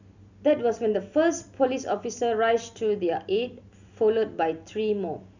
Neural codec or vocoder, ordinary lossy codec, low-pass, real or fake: none; none; 7.2 kHz; real